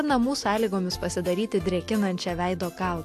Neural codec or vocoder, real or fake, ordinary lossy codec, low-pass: none; real; AAC, 64 kbps; 14.4 kHz